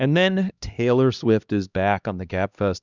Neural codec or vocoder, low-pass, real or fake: codec, 16 kHz, 1 kbps, X-Codec, HuBERT features, trained on LibriSpeech; 7.2 kHz; fake